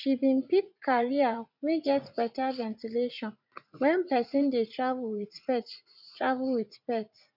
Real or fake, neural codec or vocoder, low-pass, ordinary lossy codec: fake; vocoder, 44.1 kHz, 80 mel bands, Vocos; 5.4 kHz; none